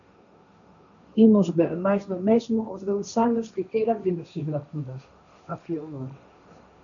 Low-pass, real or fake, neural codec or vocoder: 7.2 kHz; fake; codec, 16 kHz, 1.1 kbps, Voila-Tokenizer